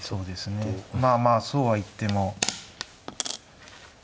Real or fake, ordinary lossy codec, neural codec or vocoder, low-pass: real; none; none; none